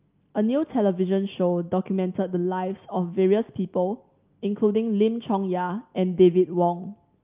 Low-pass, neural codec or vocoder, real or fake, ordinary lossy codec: 3.6 kHz; none; real; Opus, 24 kbps